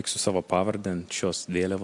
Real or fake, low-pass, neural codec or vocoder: real; 10.8 kHz; none